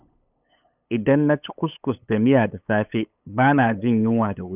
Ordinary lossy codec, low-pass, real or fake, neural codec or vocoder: none; 3.6 kHz; fake; codec, 16 kHz, 8 kbps, FunCodec, trained on LibriTTS, 25 frames a second